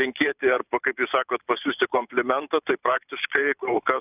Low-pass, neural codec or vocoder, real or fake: 3.6 kHz; vocoder, 44.1 kHz, 128 mel bands every 512 samples, BigVGAN v2; fake